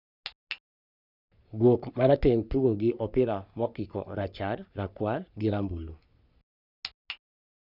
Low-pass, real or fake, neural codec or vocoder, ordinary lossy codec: 5.4 kHz; fake; codec, 44.1 kHz, 3.4 kbps, Pupu-Codec; none